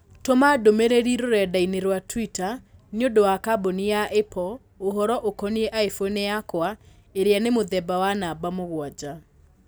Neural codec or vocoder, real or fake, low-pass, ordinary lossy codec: none; real; none; none